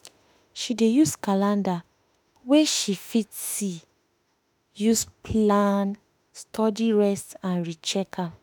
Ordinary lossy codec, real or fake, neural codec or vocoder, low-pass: none; fake; autoencoder, 48 kHz, 32 numbers a frame, DAC-VAE, trained on Japanese speech; none